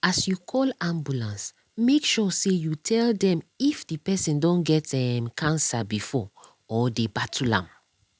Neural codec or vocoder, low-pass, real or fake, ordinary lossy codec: none; none; real; none